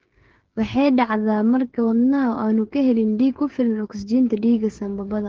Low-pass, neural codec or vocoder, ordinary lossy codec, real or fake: 7.2 kHz; codec, 16 kHz, 8 kbps, FreqCodec, smaller model; Opus, 16 kbps; fake